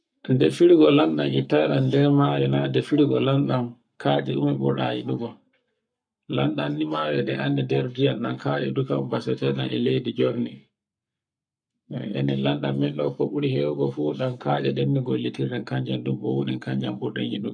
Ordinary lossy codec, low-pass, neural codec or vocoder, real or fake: none; 9.9 kHz; codec, 44.1 kHz, 7.8 kbps, Pupu-Codec; fake